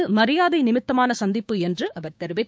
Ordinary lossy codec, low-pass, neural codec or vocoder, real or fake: none; none; codec, 16 kHz, 6 kbps, DAC; fake